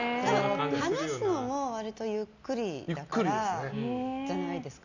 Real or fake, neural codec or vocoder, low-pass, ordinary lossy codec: real; none; 7.2 kHz; none